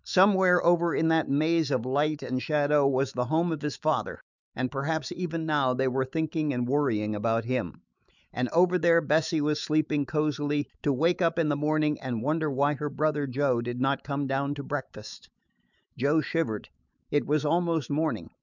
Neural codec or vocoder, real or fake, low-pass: autoencoder, 48 kHz, 128 numbers a frame, DAC-VAE, trained on Japanese speech; fake; 7.2 kHz